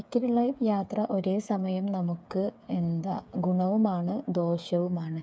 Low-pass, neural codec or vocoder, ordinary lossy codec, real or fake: none; codec, 16 kHz, 8 kbps, FreqCodec, smaller model; none; fake